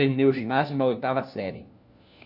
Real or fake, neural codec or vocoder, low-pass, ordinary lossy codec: fake; codec, 16 kHz, 1 kbps, FunCodec, trained on LibriTTS, 50 frames a second; 5.4 kHz; none